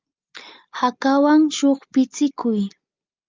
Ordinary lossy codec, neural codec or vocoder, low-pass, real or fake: Opus, 24 kbps; none; 7.2 kHz; real